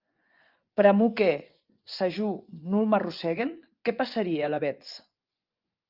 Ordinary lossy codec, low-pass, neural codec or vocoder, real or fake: Opus, 24 kbps; 5.4 kHz; vocoder, 24 kHz, 100 mel bands, Vocos; fake